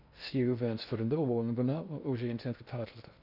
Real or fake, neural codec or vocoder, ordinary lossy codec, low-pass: fake; codec, 16 kHz in and 24 kHz out, 0.6 kbps, FocalCodec, streaming, 2048 codes; none; 5.4 kHz